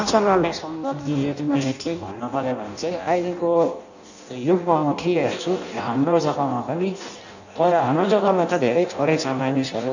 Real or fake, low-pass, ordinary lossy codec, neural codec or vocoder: fake; 7.2 kHz; none; codec, 16 kHz in and 24 kHz out, 0.6 kbps, FireRedTTS-2 codec